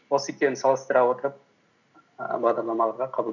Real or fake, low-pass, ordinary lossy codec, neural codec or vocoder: real; 7.2 kHz; none; none